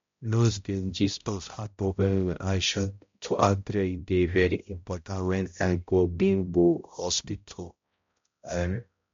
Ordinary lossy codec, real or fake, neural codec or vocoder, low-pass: MP3, 48 kbps; fake; codec, 16 kHz, 0.5 kbps, X-Codec, HuBERT features, trained on balanced general audio; 7.2 kHz